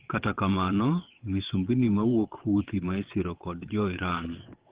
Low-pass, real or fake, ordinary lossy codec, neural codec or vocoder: 3.6 kHz; fake; Opus, 16 kbps; vocoder, 22.05 kHz, 80 mel bands, WaveNeXt